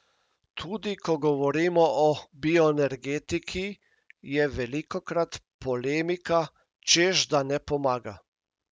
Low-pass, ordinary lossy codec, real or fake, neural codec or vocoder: none; none; real; none